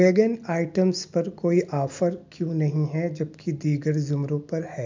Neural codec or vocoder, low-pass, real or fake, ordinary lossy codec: none; 7.2 kHz; real; MP3, 64 kbps